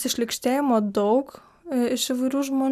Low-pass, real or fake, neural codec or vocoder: 14.4 kHz; real; none